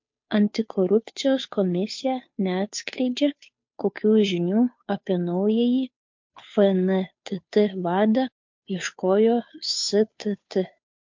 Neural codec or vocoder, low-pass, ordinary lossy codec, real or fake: codec, 16 kHz, 2 kbps, FunCodec, trained on Chinese and English, 25 frames a second; 7.2 kHz; MP3, 48 kbps; fake